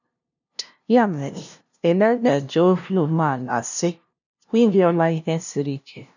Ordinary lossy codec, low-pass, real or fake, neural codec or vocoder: none; 7.2 kHz; fake; codec, 16 kHz, 0.5 kbps, FunCodec, trained on LibriTTS, 25 frames a second